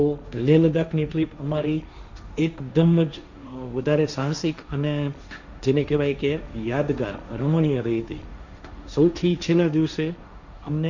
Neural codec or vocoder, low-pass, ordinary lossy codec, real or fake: codec, 16 kHz, 1.1 kbps, Voila-Tokenizer; 7.2 kHz; none; fake